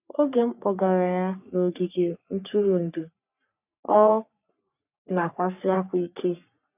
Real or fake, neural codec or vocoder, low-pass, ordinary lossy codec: fake; codec, 44.1 kHz, 3.4 kbps, Pupu-Codec; 3.6 kHz; none